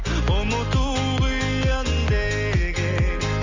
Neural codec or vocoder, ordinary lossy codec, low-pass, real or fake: none; Opus, 32 kbps; 7.2 kHz; real